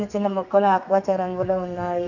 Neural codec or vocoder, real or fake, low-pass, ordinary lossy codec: codec, 32 kHz, 1.9 kbps, SNAC; fake; 7.2 kHz; none